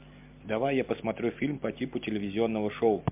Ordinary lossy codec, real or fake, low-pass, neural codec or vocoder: MP3, 32 kbps; real; 3.6 kHz; none